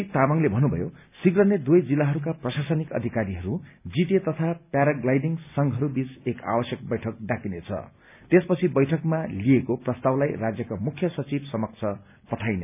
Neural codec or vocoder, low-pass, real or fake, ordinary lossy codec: none; 3.6 kHz; real; none